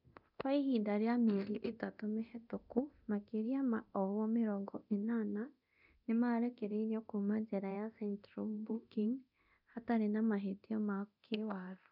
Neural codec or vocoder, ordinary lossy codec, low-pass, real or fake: codec, 24 kHz, 0.9 kbps, DualCodec; none; 5.4 kHz; fake